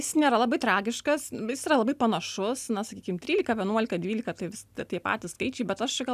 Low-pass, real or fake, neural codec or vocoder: 14.4 kHz; real; none